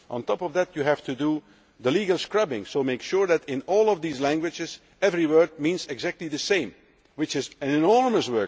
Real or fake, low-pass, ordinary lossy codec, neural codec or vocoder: real; none; none; none